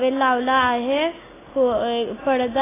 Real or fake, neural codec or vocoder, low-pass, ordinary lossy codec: real; none; 3.6 kHz; AAC, 16 kbps